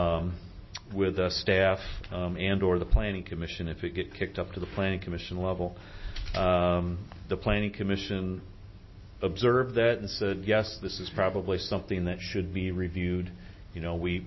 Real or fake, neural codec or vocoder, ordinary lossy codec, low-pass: real; none; MP3, 24 kbps; 7.2 kHz